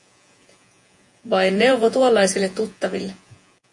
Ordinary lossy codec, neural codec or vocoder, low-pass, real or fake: MP3, 48 kbps; vocoder, 48 kHz, 128 mel bands, Vocos; 10.8 kHz; fake